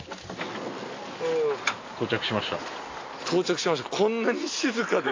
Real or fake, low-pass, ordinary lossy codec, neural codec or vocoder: real; 7.2 kHz; AAC, 32 kbps; none